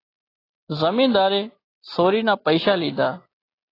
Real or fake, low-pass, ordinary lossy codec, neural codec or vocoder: real; 5.4 kHz; AAC, 24 kbps; none